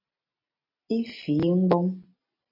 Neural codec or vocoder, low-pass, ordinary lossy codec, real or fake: none; 5.4 kHz; MP3, 24 kbps; real